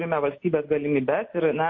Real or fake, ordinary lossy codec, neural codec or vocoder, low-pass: real; MP3, 32 kbps; none; 7.2 kHz